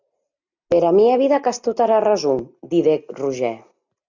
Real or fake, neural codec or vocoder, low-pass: real; none; 7.2 kHz